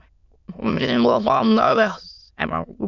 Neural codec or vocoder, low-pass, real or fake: autoencoder, 22.05 kHz, a latent of 192 numbers a frame, VITS, trained on many speakers; 7.2 kHz; fake